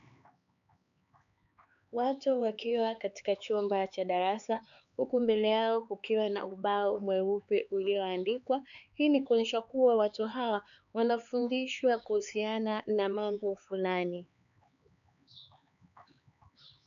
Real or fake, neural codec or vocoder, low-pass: fake; codec, 16 kHz, 4 kbps, X-Codec, HuBERT features, trained on LibriSpeech; 7.2 kHz